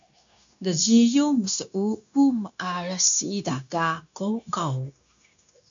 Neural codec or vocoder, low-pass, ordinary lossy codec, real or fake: codec, 16 kHz, 0.9 kbps, LongCat-Audio-Codec; 7.2 kHz; MP3, 64 kbps; fake